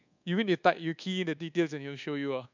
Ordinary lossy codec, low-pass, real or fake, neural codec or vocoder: none; 7.2 kHz; fake; codec, 24 kHz, 1.2 kbps, DualCodec